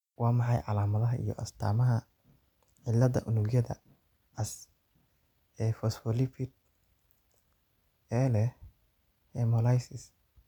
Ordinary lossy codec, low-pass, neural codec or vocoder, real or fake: none; 19.8 kHz; none; real